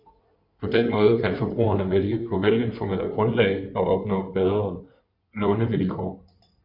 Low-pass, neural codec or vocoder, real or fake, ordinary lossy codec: 5.4 kHz; codec, 16 kHz in and 24 kHz out, 2.2 kbps, FireRedTTS-2 codec; fake; Opus, 64 kbps